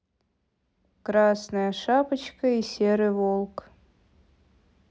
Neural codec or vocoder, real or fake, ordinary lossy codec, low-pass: none; real; none; none